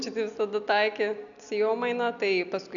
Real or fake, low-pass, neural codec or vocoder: real; 7.2 kHz; none